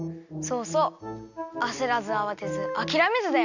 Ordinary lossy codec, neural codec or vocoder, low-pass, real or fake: none; none; 7.2 kHz; real